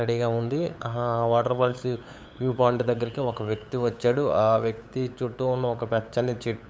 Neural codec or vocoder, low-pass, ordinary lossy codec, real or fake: codec, 16 kHz, 8 kbps, FunCodec, trained on LibriTTS, 25 frames a second; none; none; fake